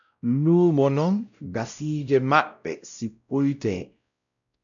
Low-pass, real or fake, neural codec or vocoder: 7.2 kHz; fake; codec, 16 kHz, 0.5 kbps, X-Codec, WavLM features, trained on Multilingual LibriSpeech